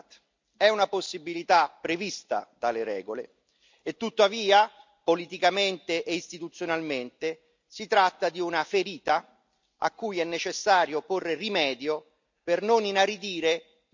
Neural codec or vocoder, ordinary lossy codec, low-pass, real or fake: none; MP3, 64 kbps; 7.2 kHz; real